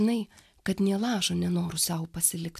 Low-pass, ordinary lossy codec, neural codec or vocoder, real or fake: 14.4 kHz; AAC, 96 kbps; none; real